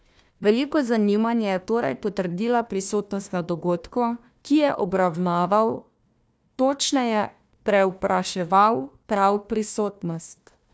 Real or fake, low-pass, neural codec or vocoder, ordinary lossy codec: fake; none; codec, 16 kHz, 1 kbps, FunCodec, trained on Chinese and English, 50 frames a second; none